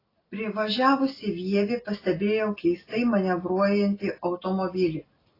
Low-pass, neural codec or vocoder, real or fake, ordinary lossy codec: 5.4 kHz; none; real; AAC, 24 kbps